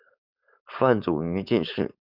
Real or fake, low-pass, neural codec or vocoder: fake; 5.4 kHz; codec, 16 kHz, 4.8 kbps, FACodec